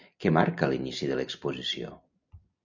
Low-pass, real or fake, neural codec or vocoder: 7.2 kHz; real; none